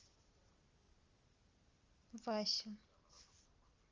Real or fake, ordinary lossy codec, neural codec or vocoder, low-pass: real; Opus, 32 kbps; none; 7.2 kHz